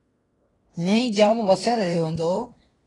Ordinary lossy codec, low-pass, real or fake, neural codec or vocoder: AAC, 32 kbps; 10.8 kHz; fake; codec, 16 kHz in and 24 kHz out, 0.9 kbps, LongCat-Audio-Codec, fine tuned four codebook decoder